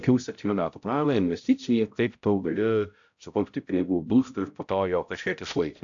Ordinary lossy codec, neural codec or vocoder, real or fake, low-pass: AAC, 64 kbps; codec, 16 kHz, 0.5 kbps, X-Codec, HuBERT features, trained on balanced general audio; fake; 7.2 kHz